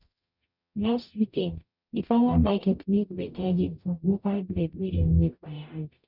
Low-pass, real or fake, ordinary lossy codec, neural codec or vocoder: 5.4 kHz; fake; none; codec, 44.1 kHz, 0.9 kbps, DAC